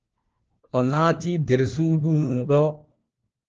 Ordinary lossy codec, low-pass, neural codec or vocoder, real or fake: Opus, 16 kbps; 7.2 kHz; codec, 16 kHz, 1 kbps, FunCodec, trained on LibriTTS, 50 frames a second; fake